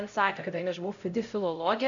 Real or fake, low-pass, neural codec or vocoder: fake; 7.2 kHz; codec, 16 kHz, 0.5 kbps, X-Codec, HuBERT features, trained on LibriSpeech